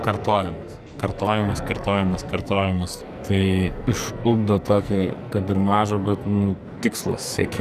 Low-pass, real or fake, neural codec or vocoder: 14.4 kHz; fake; codec, 44.1 kHz, 2.6 kbps, SNAC